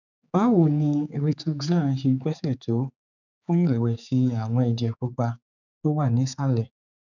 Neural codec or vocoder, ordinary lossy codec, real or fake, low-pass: codec, 16 kHz, 4 kbps, X-Codec, HuBERT features, trained on general audio; none; fake; 7.2 kHz